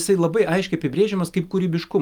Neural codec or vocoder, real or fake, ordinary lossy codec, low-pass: none; real; Opus, 32 kbps; 19.8 kHz